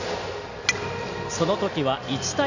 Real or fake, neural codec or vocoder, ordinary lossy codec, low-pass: real; none; none; 7.2 kHz